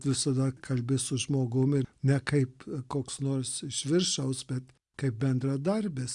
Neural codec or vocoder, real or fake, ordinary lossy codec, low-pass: none; real; Opus, 64 kbps; 10.8 kHz